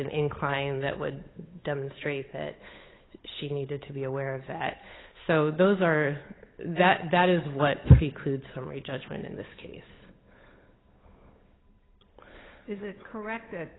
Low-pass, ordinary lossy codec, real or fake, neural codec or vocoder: 7.2 kHz; AAC, 16 kbps; fake; codec, 16 kHz, 8 kbps, FunCodec, trained on Chinese and English, 25 frames a second